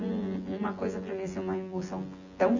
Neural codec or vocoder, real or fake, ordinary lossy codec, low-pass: vocoder, 24 kHz, 100 mel bands, Vocos; fake; none; 7.2 kHz